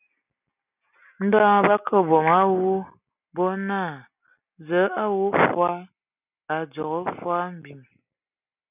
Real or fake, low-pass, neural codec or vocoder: real; 3.6 kHz; none